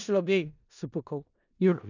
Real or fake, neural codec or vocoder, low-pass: fake; codec, 16 kHz in and 24 kHz out, 0.4 kbps, LongCat-Audio-Codec, four codebook decoder; 7.2 kHz